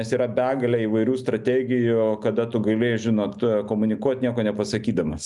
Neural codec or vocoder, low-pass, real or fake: none; 10.8 kHz; real